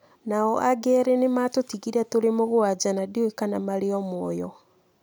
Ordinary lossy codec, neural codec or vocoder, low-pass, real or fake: none; none; none; real